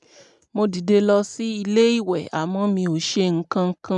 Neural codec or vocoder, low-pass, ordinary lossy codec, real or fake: none; 10.8 kHz; none; real